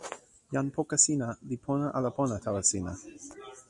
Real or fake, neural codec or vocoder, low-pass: real; none; 10.8 kHz